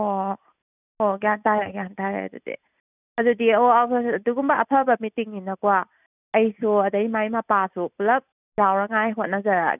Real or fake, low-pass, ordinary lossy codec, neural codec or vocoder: real; 3.6 kHz; none; none